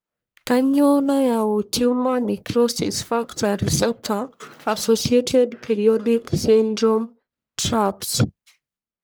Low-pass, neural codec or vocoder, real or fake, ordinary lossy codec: none; codec, 44.1 kHz, 1.7 kbps, Pupu-Codec; fake; none